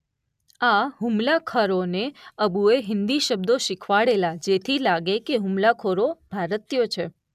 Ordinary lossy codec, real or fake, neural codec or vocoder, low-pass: none; real; none; 14.4 kHz